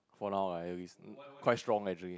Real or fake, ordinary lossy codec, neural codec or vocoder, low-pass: real; none; none; none